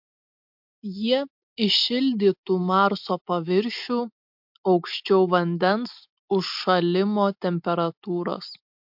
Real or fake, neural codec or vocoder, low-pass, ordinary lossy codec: real; none; 5.4 kHz; MP3, 48 kbps